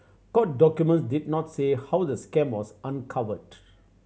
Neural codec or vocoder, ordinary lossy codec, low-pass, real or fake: none; none; none; real